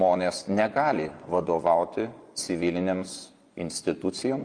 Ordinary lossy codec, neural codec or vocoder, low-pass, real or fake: Opus, 32 kbps; vocoder, 24 kHz, 100 mel bands, Vocos; 9.9 kHz; fake